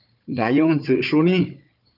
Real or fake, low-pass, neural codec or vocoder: fake; 5.4 kHz; codec, 16 kHz, 4 kbps, FunCodec, trained on Chinese and English, 50 frames a second